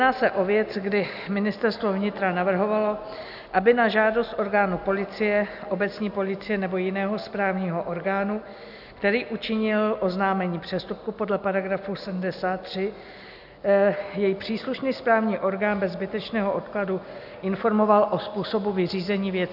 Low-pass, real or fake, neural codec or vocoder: 5.4 kHz; real; none